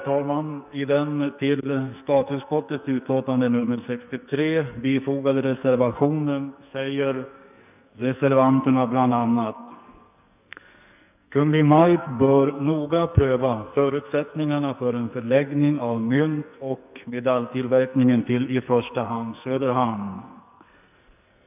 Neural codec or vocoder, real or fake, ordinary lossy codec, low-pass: codec, 44.1 kHz, 2.6 kbps, SNAC; fake; none; 3.6 kHz